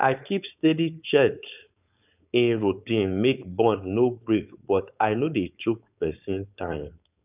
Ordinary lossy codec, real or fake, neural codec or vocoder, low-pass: none; fake; codec, 16 kHz, 4.8 kbps, FACodec; 3.6 kHz